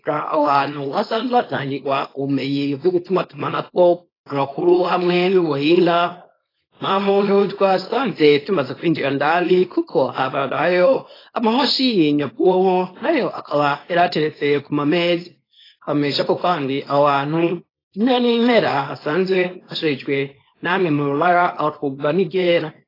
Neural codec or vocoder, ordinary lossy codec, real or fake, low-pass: codec, 24 kHz, 0.9 kbps, WavTokenizer, small release; AAC, 24 kbps; fake; 5.4 kHz